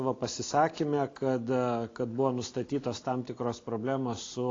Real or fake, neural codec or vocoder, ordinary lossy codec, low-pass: real; none; AAC, 32 kbps; 7.2 kHz